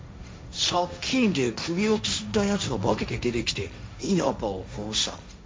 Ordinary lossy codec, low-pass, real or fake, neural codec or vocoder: none; none; fake; codec, 16 kHz, 1.1 kbps, Voila-Tokenizer